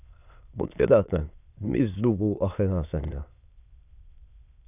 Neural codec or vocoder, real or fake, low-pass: autoencoder, 22.05 kHz, a latent of 192 numbers a frame, VITS, trained on many speakers; fake; 3.6 kHz